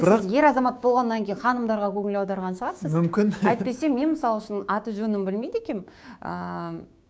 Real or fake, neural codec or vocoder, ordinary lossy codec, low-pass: fake; codec, 16 kHz, 6 kbps, DAC; none; none